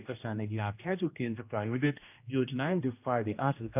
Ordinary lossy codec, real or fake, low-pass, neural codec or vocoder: none; fake; 3.6 kHz; codec, 16 kHz, 1 kbps, X-Codec, HuBERT features, trained on general audio